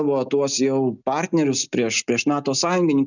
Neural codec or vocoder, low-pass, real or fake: none; 7.2 kHz; real